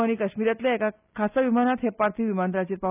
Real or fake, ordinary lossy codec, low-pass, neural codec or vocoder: real; none; 3.6 kHz; none